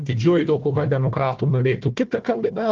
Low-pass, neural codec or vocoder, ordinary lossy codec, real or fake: 7.2 kHz; codec, 16 kHz, 1 kbps, FunCodec, trained on Chinese and English, 50 frames a second; Opus, 16 kbps; fake